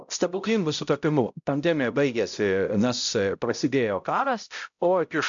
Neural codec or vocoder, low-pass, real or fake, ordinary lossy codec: codec, 16 kHz, 0.5 kbps, X-Codec, HuBERT features, trained on balanced general audio; 7.2 kHz; fake; AAC, 64 kbps